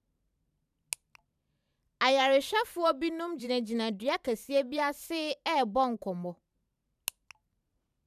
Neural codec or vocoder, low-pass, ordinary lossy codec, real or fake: vocoder, 44.1 kHz, 128 mel bands every 512 samples, BigVGAN v2; 14.4 kHz; none; fake